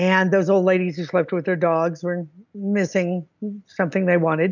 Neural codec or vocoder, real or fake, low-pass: none; real; 7.2 kHz